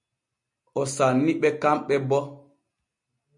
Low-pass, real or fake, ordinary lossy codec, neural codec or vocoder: 10.8 kHz; real; MP3, 64 kbps; none